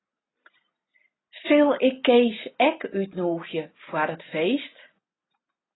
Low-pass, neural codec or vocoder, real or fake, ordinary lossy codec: 7.2 kHz; none; real; AAC, 16 kbps